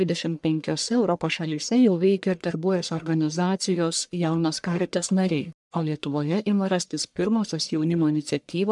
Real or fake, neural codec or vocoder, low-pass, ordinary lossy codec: fake; codec, 44.1 kHz, 1.7 kbps, Pupu-Codec; 10.8 kHz; MP3, 96 kbps